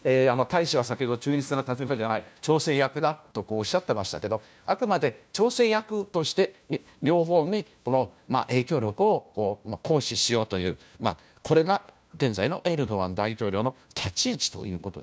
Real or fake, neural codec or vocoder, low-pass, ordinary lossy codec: fake; codec, 16 kHz, 1 kbps, FunCodec, trained on LibriTTS, 50 frames a second; none; none